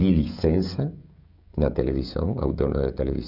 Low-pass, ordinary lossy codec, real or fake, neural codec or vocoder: 5.4 kHz; none; fake; codec, 16 kHz, 16 kbps, FreqCodec, smaller model